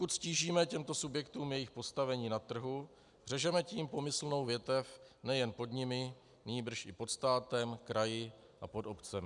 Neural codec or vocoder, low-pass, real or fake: none; 10.8 kHz; real